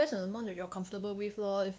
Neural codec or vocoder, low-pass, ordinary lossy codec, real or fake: codec, 16 kHz, 2 kbps, X-Codec, WavLM features, trained on Multilingual LibriSpeech; none; none; fake